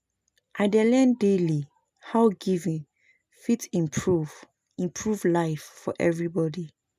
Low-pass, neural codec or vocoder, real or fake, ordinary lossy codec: 14.4 kHz; none; real; none